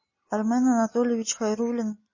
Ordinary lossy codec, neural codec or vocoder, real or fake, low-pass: MP3, 32 kbps; none; real; 7.2 kHz